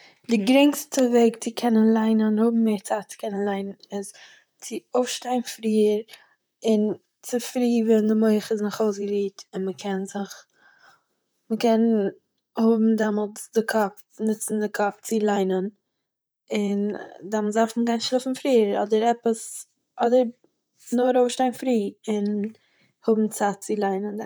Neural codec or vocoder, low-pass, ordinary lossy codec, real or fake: vocoder, 44.1 kHz, 128 mel bands, Pupu-Vocoder; none; none; fake